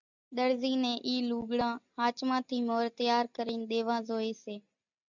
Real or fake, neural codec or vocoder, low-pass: real; none; 7.2 kHz